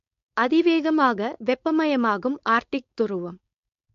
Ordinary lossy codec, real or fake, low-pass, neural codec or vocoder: MP3, 48 kbps; fake; 7.2 kHz; codec, 16 kHz, 4.8 kbps, FACodec